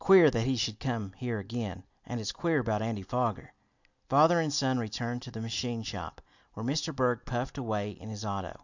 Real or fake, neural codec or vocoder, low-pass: real; none; 7.2 kHz